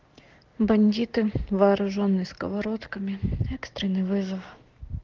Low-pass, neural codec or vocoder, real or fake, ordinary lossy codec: 7.2 kHz; none; real; Opus, 16 kbps